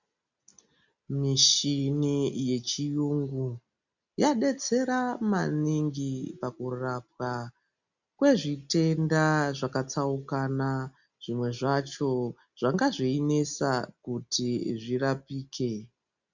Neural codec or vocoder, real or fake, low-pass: none; real; 7.2 kHz